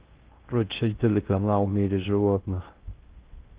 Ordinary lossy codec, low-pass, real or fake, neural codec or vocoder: Opus, 16 kbps; 3.6 kHz; fake; codec, 16 kHz in and 24 kHz out, 0.6 kbps, FocalCodec, streaming, 2048 codes